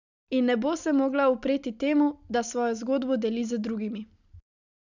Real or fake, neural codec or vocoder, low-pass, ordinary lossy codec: real; none; 7.2 kHz; none